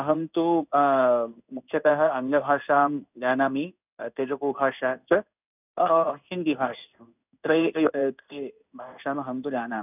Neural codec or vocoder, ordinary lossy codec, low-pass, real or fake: codec, 16 kHz in and 24 kHz out, 1 kbps, XY-Tokenizer; none; 3.6 kHz; fake